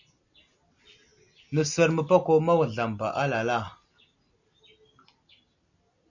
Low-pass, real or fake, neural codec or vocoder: 7.2 kHz; real; none